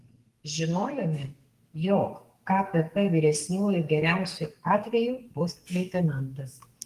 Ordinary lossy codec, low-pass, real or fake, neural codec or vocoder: Opus, 24 kbps; 14.4 kHz; fake; codec, 44.1 kHz, 2.6 kbps, SNAC